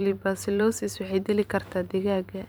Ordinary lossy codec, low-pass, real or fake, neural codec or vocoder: none; none; fake; vocoder, 44.1 kHz, 128 mel bands every 512 samples, BigVGAN v2